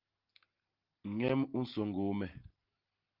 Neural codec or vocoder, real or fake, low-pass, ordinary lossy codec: none; real; 5.4 kHz; Opus, 32 kbps